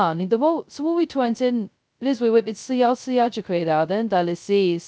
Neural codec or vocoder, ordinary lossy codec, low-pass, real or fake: codec, 16 kHz, 0.2 kbps, FocalCodec; none; none; fake